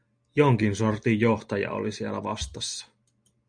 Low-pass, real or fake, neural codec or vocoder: 9.9 kHz; real; none